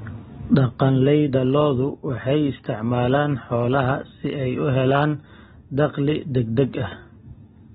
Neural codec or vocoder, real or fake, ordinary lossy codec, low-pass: none; real; AAC, 16 kbps; 9.9 kHz